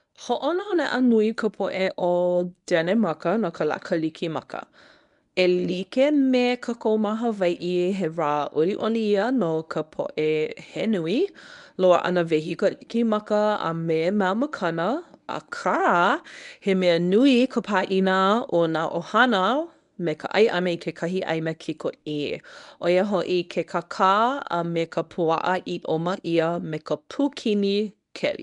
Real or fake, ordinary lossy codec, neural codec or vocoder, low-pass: fake; none; codec, 24 kHz, 0.9 kbps, WavTokenizer, medium speech release version 1; 10.8 kHz